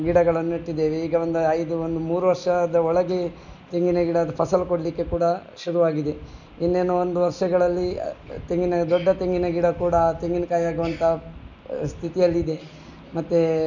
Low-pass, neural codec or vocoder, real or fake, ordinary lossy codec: 7.2 kHz; none; real; none